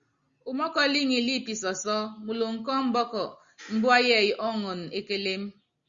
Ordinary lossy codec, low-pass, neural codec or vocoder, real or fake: Opus, 64 kbps; 7.2 kHz; none; real